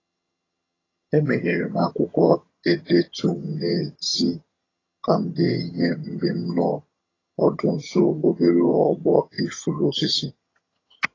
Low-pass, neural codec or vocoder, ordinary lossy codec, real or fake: 7.2 kHz; vocoder, 22.05 kHz, 80 mel bands, HiFi-GAN; AAC, 32 kbps; fake